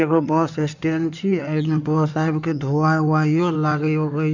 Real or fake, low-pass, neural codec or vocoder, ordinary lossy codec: fake; 7.2 kHz; codec, 16 kHz in and 24 kHz out, 2.2 kbps, FireRedTTS-2 codec; none